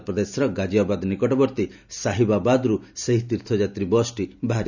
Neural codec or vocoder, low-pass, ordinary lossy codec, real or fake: none; 7.2 kHz; none; real